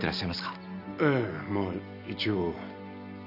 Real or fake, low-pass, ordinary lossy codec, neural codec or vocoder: real; 5.4 kHz; none; none